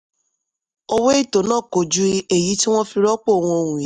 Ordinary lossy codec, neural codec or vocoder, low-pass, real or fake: MP3, 96 kbps; none; 10.8 kHz; real